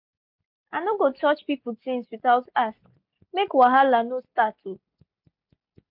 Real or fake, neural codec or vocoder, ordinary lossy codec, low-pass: real; none; MP3, 48 kbps; 5.4 kHz